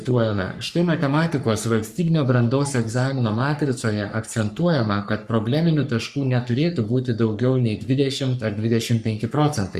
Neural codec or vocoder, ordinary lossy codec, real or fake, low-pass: codec, 44.1 kHz, 3.4 kbps, Pupu-Codec; Opus, 64 kbps; fake; 14.4 kHz